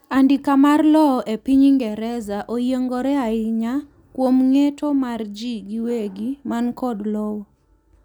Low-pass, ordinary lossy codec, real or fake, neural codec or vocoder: 19.8 kHz; none; real; none